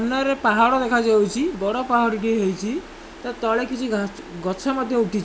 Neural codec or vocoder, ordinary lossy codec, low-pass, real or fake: none; none; none; real